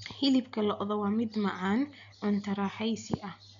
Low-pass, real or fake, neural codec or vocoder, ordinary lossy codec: 7.2 kHz; real; none; none